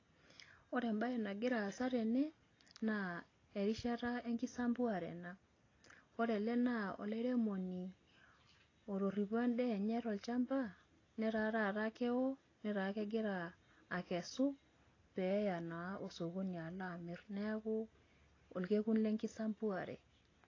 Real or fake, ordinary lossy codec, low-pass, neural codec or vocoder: real; AAC, 32 kbps; 7.2 kHz; none